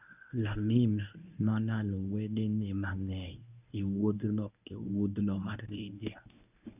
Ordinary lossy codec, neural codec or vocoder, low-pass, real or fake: none; codec, 24 kHz, 0.9 kbps, WavTokenizer, medium speech release version 1; 3.6 kHz; fake